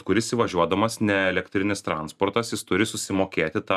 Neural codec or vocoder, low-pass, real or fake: none; 14.4 kHz; real